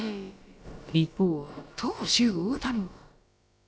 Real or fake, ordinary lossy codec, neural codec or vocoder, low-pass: fake; none; codec, 16 kHz, about 1 kbps, DyCAST, with the encoder's durations; none